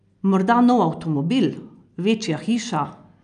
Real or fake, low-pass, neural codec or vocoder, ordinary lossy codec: real; 9.9 kHz; none; AAC, 96 kbps